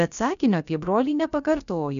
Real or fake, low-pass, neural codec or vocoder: fake; 7.2 kHz; codec, 16 kHz, about 1 kbps, DyCAST, with the encoder's durations